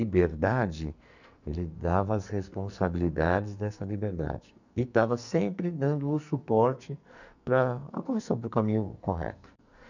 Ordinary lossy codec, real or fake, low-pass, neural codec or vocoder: none; fake; 7.2 kHz; codec, 44.1 kHz, 2.6 kbps, SNAC